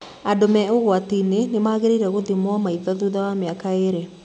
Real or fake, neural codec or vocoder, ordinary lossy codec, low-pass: real; none; none; 9.9 kHz